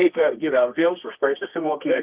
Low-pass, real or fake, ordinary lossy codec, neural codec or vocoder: 3.6 kHz; fake; Opus, 24 kbps; codec, 24 kHz, 0.9 kbps, WavTokenizer, medium music audio release